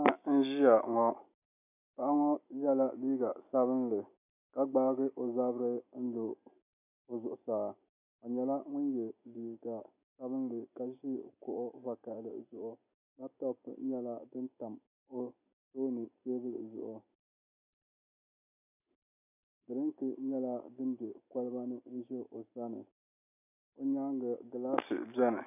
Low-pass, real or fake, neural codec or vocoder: 3.6 kHz; real; none